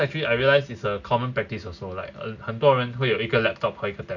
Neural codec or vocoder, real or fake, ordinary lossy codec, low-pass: none; real; none; 7.2 kHz